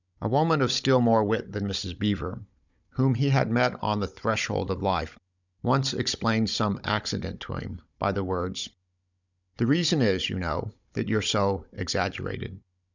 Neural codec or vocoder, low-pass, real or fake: codec, 16 kHz, 16 kbps, FunCodec, trained on Chinese and English, 50 frames a second; 7.2 kHz; fake